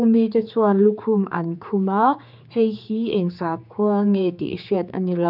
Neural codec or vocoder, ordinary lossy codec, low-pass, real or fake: codec, 16 kHz, 4 kbps, X-Codec, HuBERT features, trained on general audio; none; 5.4 kHz; fake